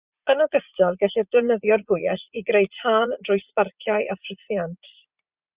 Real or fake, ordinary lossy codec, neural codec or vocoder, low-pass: fake; Opus, 64 kbps; vocoder, 44.1 kHz, 80 mel bands, Vocos; 3.6 kHz